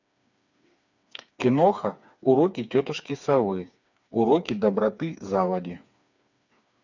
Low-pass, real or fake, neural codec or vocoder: 7.2 kHz; fake; codec, 16 kHz, 4 kbps, FreqCodec, smaller model